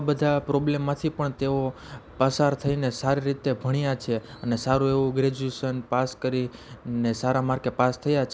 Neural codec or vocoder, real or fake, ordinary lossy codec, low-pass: none; real; none; none